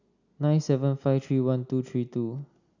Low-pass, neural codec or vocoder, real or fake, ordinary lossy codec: 7.2 kHz; none; real; none